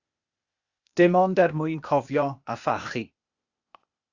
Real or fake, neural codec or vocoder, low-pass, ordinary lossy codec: fake; codec, 16 kHz, 0.8 kbps, ZipCodec; 7.2 kHz; Opus, 64 kbps